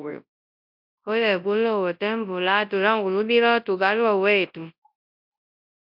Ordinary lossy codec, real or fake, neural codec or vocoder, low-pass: MP3, 48 kbps; fake; codec, 24 kHz, 0.9 kbps, WavTokenizer, large speech release; 5.4 kHz